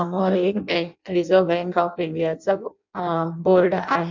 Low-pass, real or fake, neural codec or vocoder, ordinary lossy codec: 7.2 kHz; fake; codec, 16 kHz in and 24 kHz out, 0.6 kbps, FireRedTTS-2 codec; none